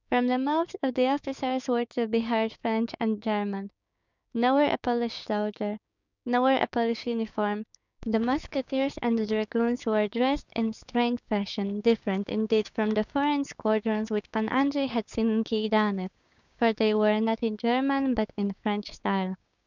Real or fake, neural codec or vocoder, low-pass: fake; codec, 16 kHz, 6 kbps, DAC; 7.2 kHz